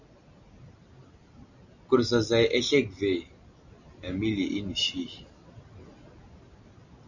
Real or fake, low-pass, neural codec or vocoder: real; 7.2 kHz; none